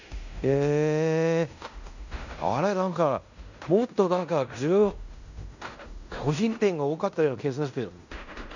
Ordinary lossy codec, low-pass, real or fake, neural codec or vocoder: none; 7.2 kHz; fake; codec, 16 kHz in and 24 kHz out, 0.9 kbps, LongCat-Audio-Codec, fine tuned four codebook decoder